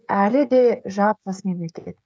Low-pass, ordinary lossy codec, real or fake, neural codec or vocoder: none; none; fake; codec, 16 kHz, 16 kbps, FreqCodec, smaller model